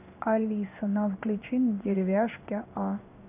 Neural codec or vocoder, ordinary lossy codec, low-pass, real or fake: codec, 16 kHz in and 24 kHz out, 1 kbps, XY-Tokenizer; none; 3.6 kHz; fake